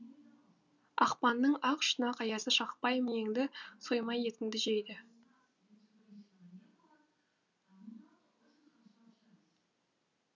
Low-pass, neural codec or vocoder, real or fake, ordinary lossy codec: 7.2 kHz; none; real; none